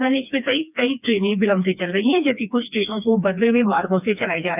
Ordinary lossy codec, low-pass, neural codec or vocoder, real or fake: none; 3.6 kHz; codec, 16 kHz, 2 kbps, FreqCodec, smaller model; fake